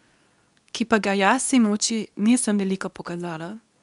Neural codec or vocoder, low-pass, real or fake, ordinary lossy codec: codec, 24 kHz, 0.9 kbps, WavTokenizer, medium speech release version 2; 10.8 kHz; fake; none